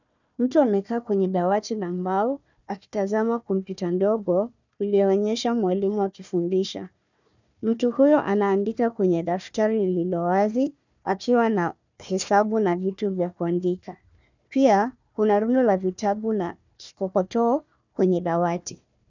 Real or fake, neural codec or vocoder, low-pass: fake; codec, 16 kHz, 1 kbps, FunCodec, trained on Chinese and English, 50 frames a second; 7.2 kHz